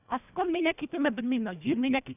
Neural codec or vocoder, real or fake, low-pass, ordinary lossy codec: codec, 24 kHz, 1.5 kbps, HILCodec; fake; 3.6 kHz; none